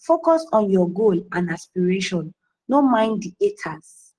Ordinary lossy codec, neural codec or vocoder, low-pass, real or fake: Opus, 16 kbps; none; 9.9 kHz; real